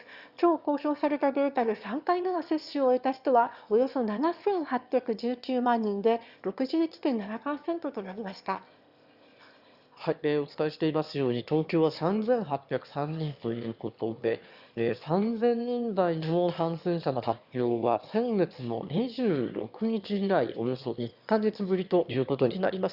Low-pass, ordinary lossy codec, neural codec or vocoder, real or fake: 5.4 kHz; Opus, 64 kbps; autoencoder, 22.05 kHz, a latent of 192 numbers a frame, VITS, trained on one speaker; fake